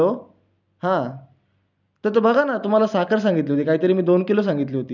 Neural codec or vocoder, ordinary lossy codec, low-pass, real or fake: none; none; 7.2 kHz; real